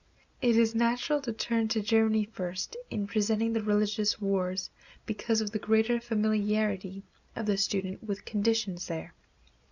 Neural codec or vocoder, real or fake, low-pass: none; real; 7.2 kHz